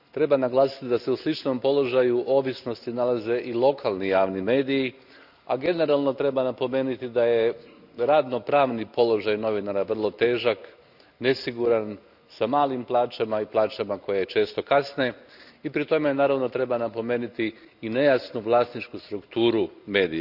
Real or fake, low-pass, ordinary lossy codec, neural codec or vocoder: real; 5.4 kHz; none; none